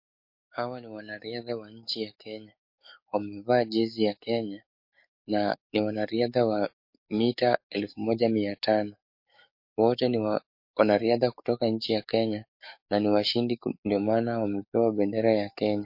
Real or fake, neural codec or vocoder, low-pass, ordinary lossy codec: fake; codec, 44.1 kHz, 7.8 kbps, DAC; 5.4 kHz; MP3, 32 kbps